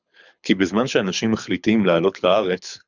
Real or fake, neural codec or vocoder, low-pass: fake; codec, 24 kHz, 6 kbps, HILCodec; 7.2 kHz